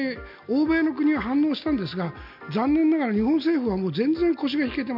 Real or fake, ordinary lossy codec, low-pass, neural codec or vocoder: real; none; 5.4 kHz; none